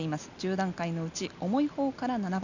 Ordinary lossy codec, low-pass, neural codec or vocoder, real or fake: none; 7.2 kHz; none; real